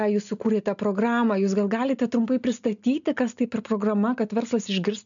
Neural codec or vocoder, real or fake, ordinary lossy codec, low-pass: none; real; MP3, 96 kbps; 7.2 kHz